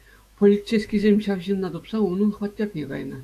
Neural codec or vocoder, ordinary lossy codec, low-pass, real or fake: autoencoder, 48 kHz, 128 numbers a frame, DAC-VAE, trained on Japanese speech; AAC, 96 kbps; 14.4 kHz; fake